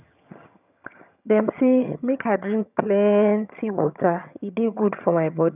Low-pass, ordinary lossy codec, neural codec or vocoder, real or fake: 3.6 kHz; none; vocoder, 22.05 kHz, 80 mel bands, HiFi-GAN; fake